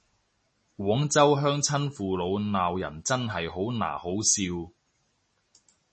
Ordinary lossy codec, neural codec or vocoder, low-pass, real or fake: MP3, 32 kbps; none; 10.8 kHz; real